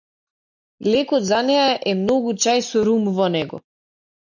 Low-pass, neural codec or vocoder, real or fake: 7.2 kHz; none; real